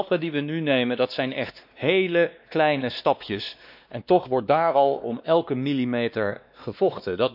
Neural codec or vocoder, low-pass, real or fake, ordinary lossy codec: codec, 16 kHz, 2 kbps, X-Codec, WavLM features, trained on Multilingual LibriSpeech; 5.4 kHz; fake; none